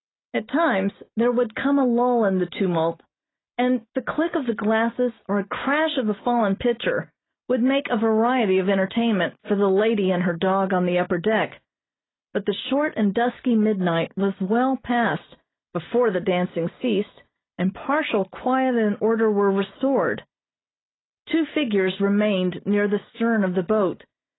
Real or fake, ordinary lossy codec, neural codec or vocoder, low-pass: real; AAC, 16 kbps; none; 7.2 kHz